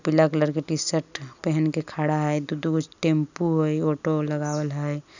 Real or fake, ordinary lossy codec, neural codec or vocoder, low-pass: real; none; none; 7.2 kHz